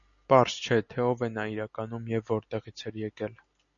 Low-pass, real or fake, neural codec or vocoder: 7.2 kHz; real; none